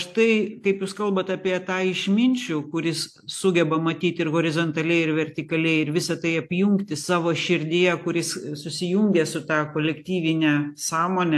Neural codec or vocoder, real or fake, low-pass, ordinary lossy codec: none; real; 14.4 kHz; AAC, 64 kbps